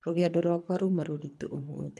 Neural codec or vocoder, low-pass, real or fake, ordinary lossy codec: codec, 24 kHz, 3 kbps, HILCodec; none; fake; none